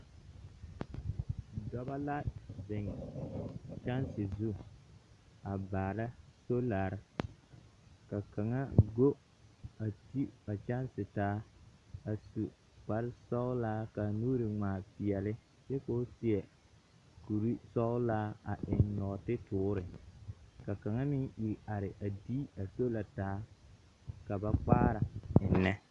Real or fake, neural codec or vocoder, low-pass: real; none; 10.8 kHz